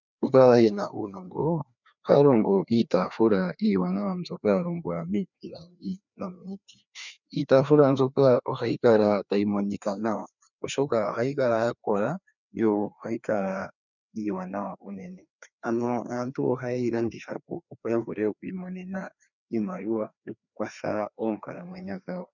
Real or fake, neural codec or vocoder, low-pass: fake; codec, 16 kHz, 2 kbps, FreqCodec, larger model; 7.2 kHz